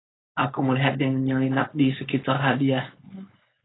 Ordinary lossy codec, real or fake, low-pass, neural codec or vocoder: AAC, 16 kbps; fake; 7.2 kHz; codec, 16 kHz, 4.8 kbps, FACodec